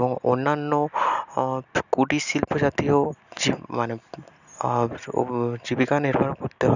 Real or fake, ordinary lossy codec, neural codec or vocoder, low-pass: real; none; none; 7.2 kHz